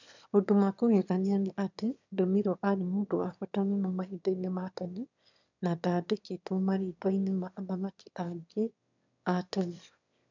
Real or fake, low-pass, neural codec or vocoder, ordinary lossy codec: fake; 7.2 kHz; autoencoder, 22.05 kHz, a latent of 192 numbers a frame, VITS, trained on one speaker; none